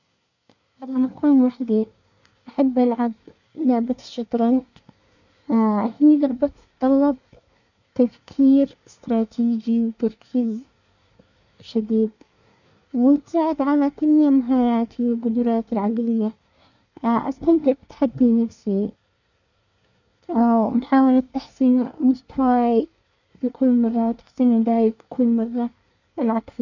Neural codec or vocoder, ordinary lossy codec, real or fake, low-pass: codec, 24 kHz, 1 kbps, SNAC; none; fake; 7.2 kHz